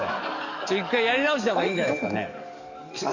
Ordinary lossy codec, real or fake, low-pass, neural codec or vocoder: none; fake; 7.2 kHz; codec, 44.1 kHz, 7.8 kbps, DAC